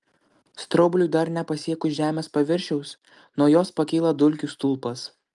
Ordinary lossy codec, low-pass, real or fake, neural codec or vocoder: Opus, 32 kbps; 10.8 kHz; real; none